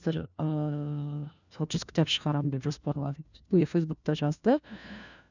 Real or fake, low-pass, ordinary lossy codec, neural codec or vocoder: fake; 7.2 kHz; none; codec, 16 kHz, 1 kbps, FunCodec, trained on LibriTTS, 50 frames a second